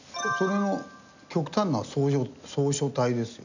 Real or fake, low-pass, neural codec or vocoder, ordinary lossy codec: real; 7.2 kHz; none; none